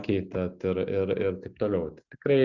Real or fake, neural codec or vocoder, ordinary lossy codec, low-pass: real; none; Opus, 64 kbps; 7.2 kHz